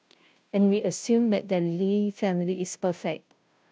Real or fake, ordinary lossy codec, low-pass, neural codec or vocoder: fake; none; none; codec, 16 kHz, 0.5 kbps, FunCodec, trained on Chinese and English, 25 frames a second